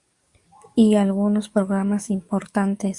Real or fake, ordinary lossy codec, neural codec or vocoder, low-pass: fake; Opus, 64 kbps; codec, 44.1 kHz, 7.8 kbps, DAC; 10.8 kHz